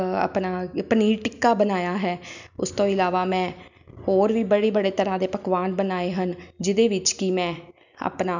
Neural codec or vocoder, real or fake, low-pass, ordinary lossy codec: none; real; 7.2 kHz; none